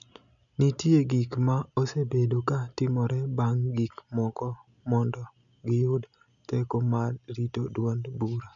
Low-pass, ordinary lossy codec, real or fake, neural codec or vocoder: 7.2 kHz; none; real; none